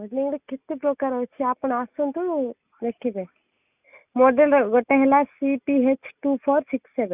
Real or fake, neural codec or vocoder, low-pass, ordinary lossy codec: real; none; 3.6 kHz; none